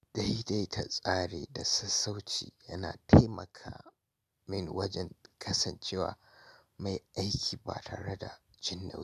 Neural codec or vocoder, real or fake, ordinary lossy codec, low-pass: none; real; none; 14.4 kHz